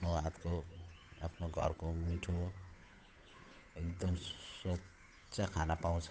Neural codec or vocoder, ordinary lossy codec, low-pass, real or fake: codec, 16 kHz, 8 kbps, FunCodec, trained on Chinese and English, 25 frames a second; none; none; fake